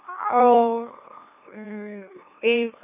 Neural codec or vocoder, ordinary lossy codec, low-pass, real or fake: autoencoder, 44.1 kHz, a latent of 192 numbers a frame, MeloTTS; none; 3.6 kHz; fake